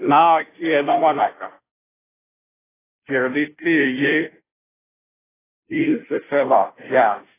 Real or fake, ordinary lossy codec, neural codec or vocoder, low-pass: fake; AAC, 16 kbps; codec, 16 kHz, 0.5 kbps, FunCodec, trained on Chinese and English, 25 frames a second; 3.6 kHz